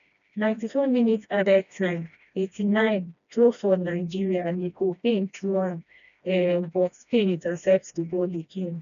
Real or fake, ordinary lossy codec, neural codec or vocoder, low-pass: fake; none; codec, 16 kHz, 1 kbps, FreqCodec, smaller model; 7.2 kHz